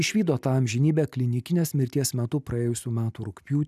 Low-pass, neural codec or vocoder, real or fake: 14.4 kHz; none; real